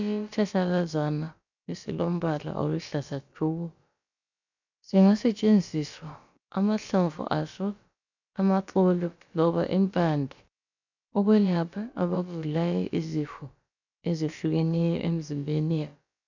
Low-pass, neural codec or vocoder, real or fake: 7.2 kHz; codec, 16 kHz, about 1 kbps, DyCAST, with the encoder's durations; fake